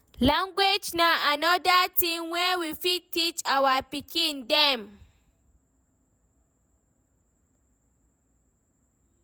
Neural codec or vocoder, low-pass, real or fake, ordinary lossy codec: vocoder, 48 kHz, 128 mel bands, Vocos; none; fake; none